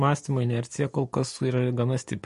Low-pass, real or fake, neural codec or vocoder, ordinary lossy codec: 10.8 kHz; real; none; MP3, 48 kbps